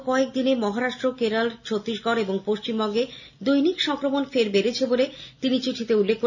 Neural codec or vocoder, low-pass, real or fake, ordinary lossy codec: none; 7.2 kHz; real; none